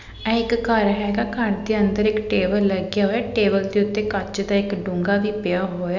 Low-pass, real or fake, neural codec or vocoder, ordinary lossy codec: 7.2 kHz; real; none; none